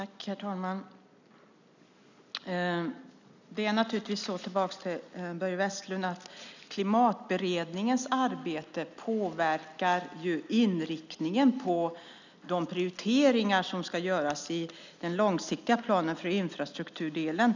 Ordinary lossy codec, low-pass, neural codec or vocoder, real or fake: none; 7.2 kHz; none; real